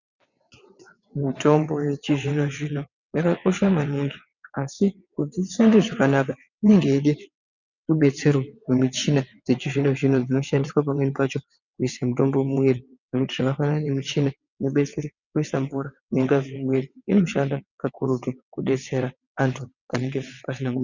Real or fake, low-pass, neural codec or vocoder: fake; 7.2 kHz; vocoder, 22.05 kHz, 80 mel bands, WaveNeXt